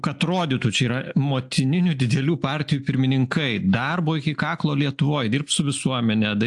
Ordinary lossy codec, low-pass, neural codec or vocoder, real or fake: AAC, 64 kbps; 10.8 kHz; none; real